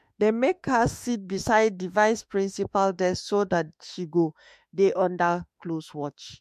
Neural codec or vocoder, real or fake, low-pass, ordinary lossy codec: autoencoder, 48 kHz, 32 numbers a frame, DAC-VAE, trained on Japanese speech; fake; 14.4 kHz; MP3, 64 kbps